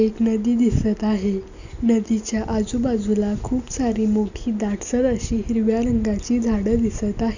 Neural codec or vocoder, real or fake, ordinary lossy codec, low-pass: none; real; none; 7.2 kHz